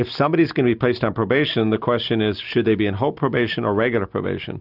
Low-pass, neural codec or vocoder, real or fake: 5.4 kHz; none; real